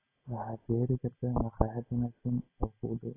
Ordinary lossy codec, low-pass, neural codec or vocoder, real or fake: AAC, 24 kbps; 3.6 kHz; none; real